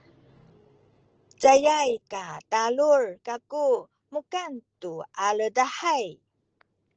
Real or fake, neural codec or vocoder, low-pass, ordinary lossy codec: real; none; 7.2 kHz; Opus, 16 kbps